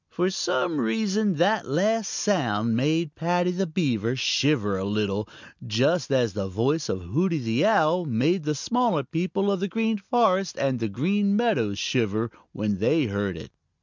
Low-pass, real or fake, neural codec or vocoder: 7.2 kHz; real; none